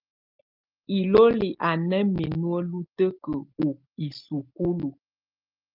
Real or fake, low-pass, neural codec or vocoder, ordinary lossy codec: real; 5.4 kHz; none; Opus, 32 kbps